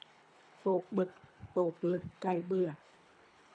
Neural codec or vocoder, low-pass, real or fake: codec, 24 kHz, 3 kbps, HILCodec; 9.9 kHz; fake